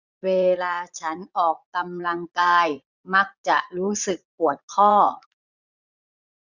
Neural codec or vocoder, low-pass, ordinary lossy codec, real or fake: vocoder, 44.1 kHz, 80 mel bands, Vocos; 7.2 kHz; none; fake